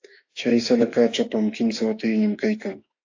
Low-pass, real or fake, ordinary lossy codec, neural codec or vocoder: 7.2 kHz; fake; AAC, 48 kbps; autoencoder, 48 kHz, 32 numbers a frame, DAC-VAE, trained on Japanese speech